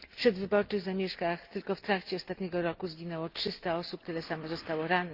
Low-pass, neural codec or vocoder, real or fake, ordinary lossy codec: 5.4 kHz; none; real; Opus, 32 kbps